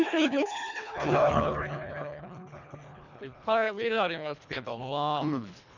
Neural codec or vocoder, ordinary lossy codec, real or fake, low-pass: codec, 24 kHz, 1.5 kbps, HILCodec; none; fake; 7.2 kHz